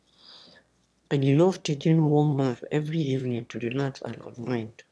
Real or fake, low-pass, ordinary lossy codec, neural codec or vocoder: fake; none; none; autoencoder, 22.05 kHz, a latent of 192 numbers a frame, VITS, trained on one speaker